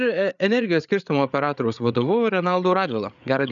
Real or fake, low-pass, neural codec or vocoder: fake; 7.2 kHz; codec, 16 kHz, 8 kbps, FreqCodec, larger model